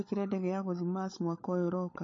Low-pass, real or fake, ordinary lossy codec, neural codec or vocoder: 7.2 kHz; fake; MP3, 32 kbps; codec, 16 kHz, 16 kbps, FunCodec, trained on LibriTTS, 50 frames a second